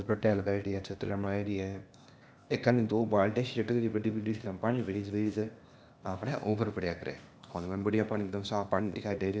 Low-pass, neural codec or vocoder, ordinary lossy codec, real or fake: none; codec, 16 kHz, 0.8 kbps, ZipCodec; none; fake